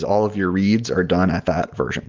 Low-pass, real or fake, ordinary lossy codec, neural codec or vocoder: 7.2 kHz; real; Opus, 32 kbps; none